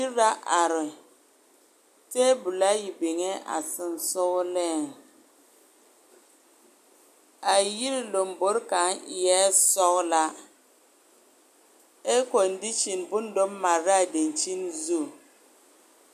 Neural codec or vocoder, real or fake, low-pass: none; real; 14.4 kHz